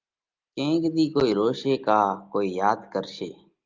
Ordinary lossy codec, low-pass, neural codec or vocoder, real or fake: Opus, 24 kbps; 7.2 kHz; none; real